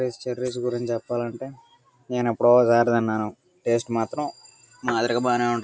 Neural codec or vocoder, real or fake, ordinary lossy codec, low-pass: none; real; none; none